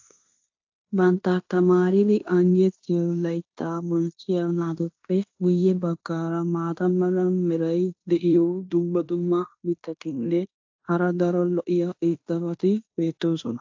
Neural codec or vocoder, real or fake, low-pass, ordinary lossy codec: codec, 16 kHz in and 24 kHz out, 0.9 kbps, LongCat-Audio-Codec, fine tuned four codebook decoder; fake; 7.2 kHz; AAC, 48 kbps